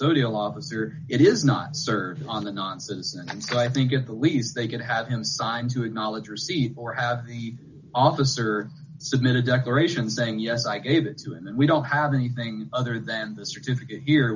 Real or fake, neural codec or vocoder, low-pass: real; none; 7.2 kHz